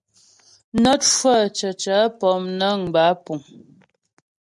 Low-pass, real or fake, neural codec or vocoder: 10.8 kHz; real; none